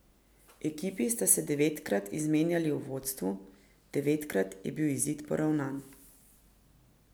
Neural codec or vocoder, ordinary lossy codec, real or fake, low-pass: none; none; real; none